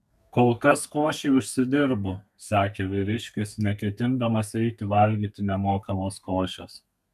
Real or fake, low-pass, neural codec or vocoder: fake; 14.4 kHz; codec, 32 kHz, 1.9 kbps, SNAC